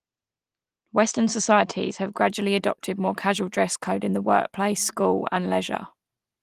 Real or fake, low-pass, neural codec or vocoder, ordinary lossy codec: real; 14.4 kHz; none; Opus, 24 kbps